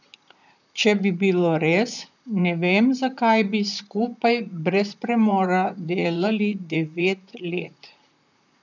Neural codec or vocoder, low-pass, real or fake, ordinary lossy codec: vocoder, 44.1 kHz, 128 mel bands, Pupu-Vocoder; 7.2 kHz; fake; none